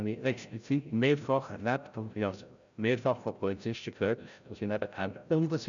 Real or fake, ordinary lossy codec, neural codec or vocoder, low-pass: fake; none; codec, 16 kHz, 0.5 kbps, FreqCodec, larger model; 7.2 kHz